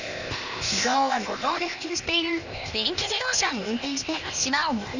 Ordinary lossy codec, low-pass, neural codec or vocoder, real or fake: none; 7.2 kHz; codec, 16 kHz, 0.8 kbps, ZipCodec; fake